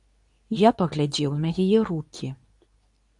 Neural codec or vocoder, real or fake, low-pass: codec, 24 kHz, 0.9 kbps, WavTokenizer, medium speech release version 2; fake; 10.8 kHz